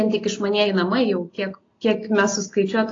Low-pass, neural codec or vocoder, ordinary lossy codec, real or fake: 7.2 kHz; none; AAC, 32 kbps; real